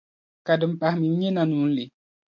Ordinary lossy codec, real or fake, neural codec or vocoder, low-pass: MP3, 48 kbps; real; none; 7.2 kHz